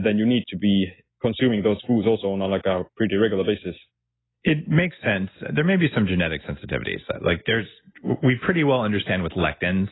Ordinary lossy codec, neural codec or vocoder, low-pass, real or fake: AAC, 16 kbps; none; 7.2 kHz; real